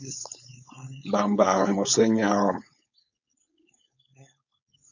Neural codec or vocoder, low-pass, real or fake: codec, 16 kHz, 4.8 kbps, FACodec; 7.2 kHz; fake